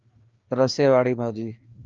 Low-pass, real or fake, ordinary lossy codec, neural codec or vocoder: 7.2 kHz; fake; Opus, 24 kbps; codec, 16 kHz, 2 kbps, FreqCodec, larger model